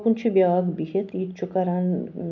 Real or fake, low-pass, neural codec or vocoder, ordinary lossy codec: real; 7.2 kHz; none; none